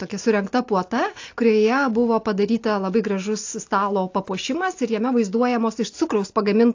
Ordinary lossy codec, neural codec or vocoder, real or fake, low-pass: AAC, 48 kbps; none; real; 7.2 kHz